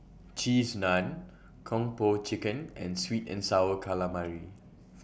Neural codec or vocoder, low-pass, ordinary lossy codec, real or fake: none; none; none; real